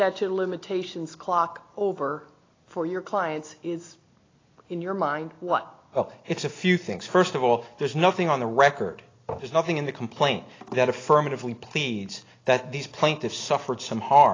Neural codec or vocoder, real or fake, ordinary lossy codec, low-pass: none; real; AAC, 32 kbps; 7.2 kHz